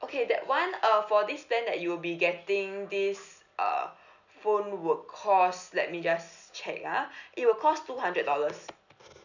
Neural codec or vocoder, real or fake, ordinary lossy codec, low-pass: none; real; none; 7.2 kHz